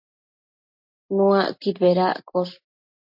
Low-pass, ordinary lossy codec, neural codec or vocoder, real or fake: 5.4 kHz; MP3, 32 kbps; none; real